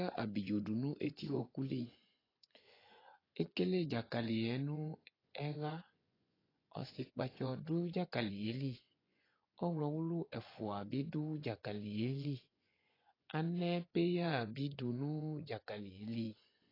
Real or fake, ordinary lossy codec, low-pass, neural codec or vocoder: fake; AAC, 24 kbps; 5.4 kHz; vocoder, 24 kHz, 100 mel bands, Vocos